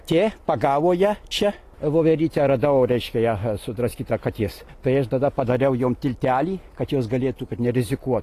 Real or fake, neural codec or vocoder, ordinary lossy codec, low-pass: real; none; AAC, 48 kbps; 14.4 kHz